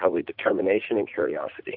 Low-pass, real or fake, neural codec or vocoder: 5.4 kHz; fake; vocoder, 44.1 kHz, 80 mel bands, Vocos